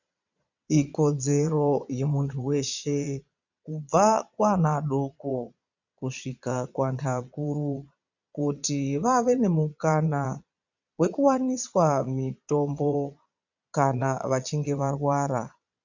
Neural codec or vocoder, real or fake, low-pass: vocoder, 22.05 kHz, 80 mel bands, Vocos; fake; 7.2 kHz